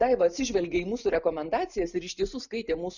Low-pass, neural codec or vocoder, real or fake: 7.2 kHz; none; real